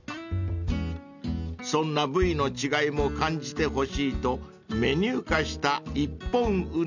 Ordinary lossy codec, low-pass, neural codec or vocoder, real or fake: none; 7.2 kHz; none; real